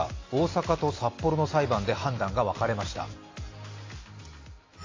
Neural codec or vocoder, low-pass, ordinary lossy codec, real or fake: none; 7.2 kHz; AAC, 32 kbps; real